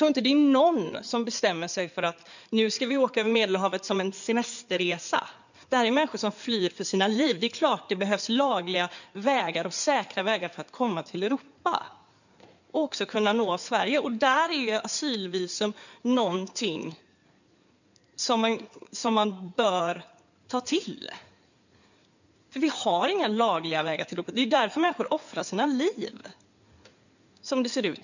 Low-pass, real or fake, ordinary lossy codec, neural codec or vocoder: 7.2 kHz; fake; none; codec, 16 kHz in and 24 kHz out, 2.2 kbps, FireRedTTS-2 codec